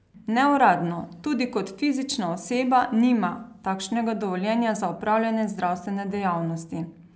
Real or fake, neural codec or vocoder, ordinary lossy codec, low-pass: real; none; none; none